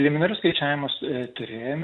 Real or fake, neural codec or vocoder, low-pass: real; none; 10.8 kHz